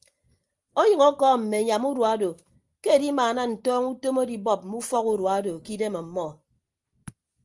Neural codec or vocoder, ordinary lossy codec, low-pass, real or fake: none; Opus, 32 kbps; 10.8 kHz; real